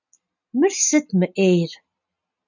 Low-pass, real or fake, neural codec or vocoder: 7.2 kHz; real; none